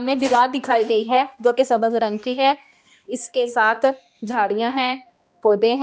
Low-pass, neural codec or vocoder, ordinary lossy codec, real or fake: none; codec, 16 kHz, 1 kbps, X-Codec, HuBERT features, trained on balanced general audio; none; fake